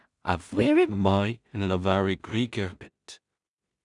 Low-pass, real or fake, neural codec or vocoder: 10.8 kHz; fake; codec, 16 kHz in and 24 kHz out, 0.4 kbps, LongCat-Audio-Codec, two codebook decoder